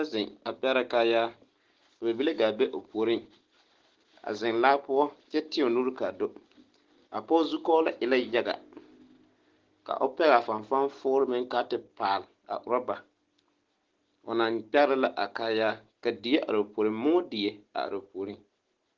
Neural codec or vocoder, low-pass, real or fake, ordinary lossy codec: none; 7.2 kHz; real; Opus, 16 kbps